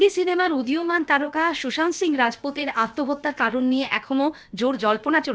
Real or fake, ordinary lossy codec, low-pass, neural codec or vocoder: fake; none; none; codec, 16 kHz, about 1 kbps, DyCAST, with the encoder's durations